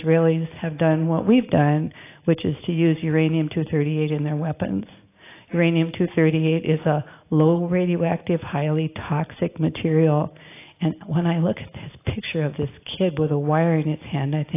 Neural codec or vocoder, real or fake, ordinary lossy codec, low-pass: none; real; AAC, 24 kbps; 3.6 kHz